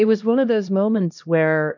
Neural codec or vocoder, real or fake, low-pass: codec, 16 kHz, 1 kbps, X-Codec, HuBERT features, trained on LibriSpeech; fake; 7.2 kHz